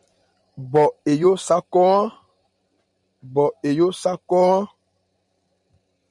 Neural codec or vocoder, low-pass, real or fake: vocoder, 44.1 kHz, 128 mel bands every 512 samples, BigVGAN v2; 10.8 kHz; fake